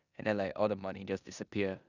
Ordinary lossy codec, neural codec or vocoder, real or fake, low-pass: none; codec, 16 kHz in and 24 kHz out, 0.9 kbps, LongCat-Audio-Codec, four codebook decoder; fake; 7.2 kHz